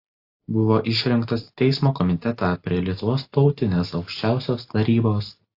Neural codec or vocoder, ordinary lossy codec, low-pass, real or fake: none; AAC, 32 kbps; 5.4 kHz; real